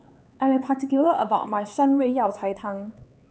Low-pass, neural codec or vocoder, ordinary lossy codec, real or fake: none; codec, 16 kHz, 4 kbps, X-Codec, HuBERT features, trained on LibriSpeech; none; fake